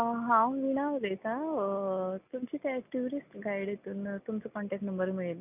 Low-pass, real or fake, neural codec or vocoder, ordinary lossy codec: 3.6 kHz; real; none; none